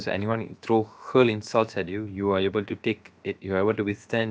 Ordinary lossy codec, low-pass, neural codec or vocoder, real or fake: none; none; codec, 16 kHz, about 1 kbps, DyCAST, with the encoder's durations; fake